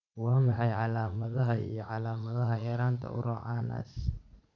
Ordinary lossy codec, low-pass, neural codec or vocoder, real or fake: none; 7.2 kHz; vocoder, 44.1 kHz, 80 mel bands, Vocos; fake